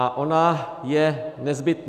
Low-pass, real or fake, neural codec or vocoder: 14.4 kHz; real; none